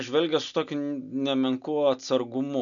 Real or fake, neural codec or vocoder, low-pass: real; none; 7.2 kHz